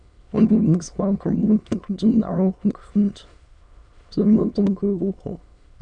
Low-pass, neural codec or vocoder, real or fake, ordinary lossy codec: 9.9 kHz; autoencoder, 22.05 kHz, a latent of 192 numbers a frame, VITS, trained on many speakers; fake; none